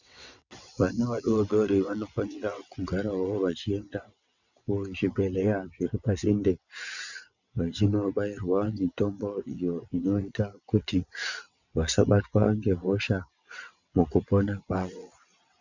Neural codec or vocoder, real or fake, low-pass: vocoder, 22.05 kHz, 80 mel bands, WaveNeXt; fake; 7.2 kHz